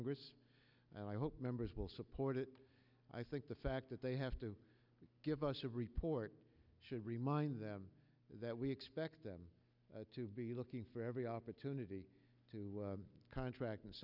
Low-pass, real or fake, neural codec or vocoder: 5.4 kHz; real; none